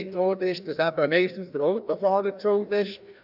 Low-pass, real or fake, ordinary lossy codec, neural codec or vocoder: 5.4 kHz; fake; AAC, 48 kbps; codec, 16 kHz, 1 kbps, FreqCodec, larger model